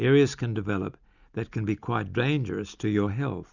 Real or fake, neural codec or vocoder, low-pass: real; none; 7.2 kHz